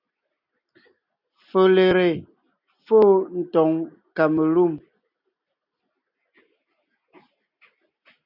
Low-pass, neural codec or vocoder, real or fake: 5.4 kHz; none; real